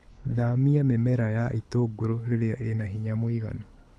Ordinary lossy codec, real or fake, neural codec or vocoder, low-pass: none; fake; codec, 24 kHz, 6 kbps, HILCodec; none